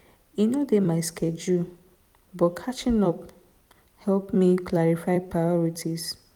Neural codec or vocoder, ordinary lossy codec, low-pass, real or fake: vocoder, 44.1 kHz, 128 mel bands every 256 samples, BigVGAN v2; none; 19.8 kHz; fake